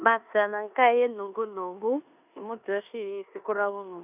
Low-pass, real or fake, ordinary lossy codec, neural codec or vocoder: 3.6 kHz; fake; none; codec, 16 kHz in and 24 kHz out, 0.9 kbps, LongCat-Audio-Codec, four codebook decoder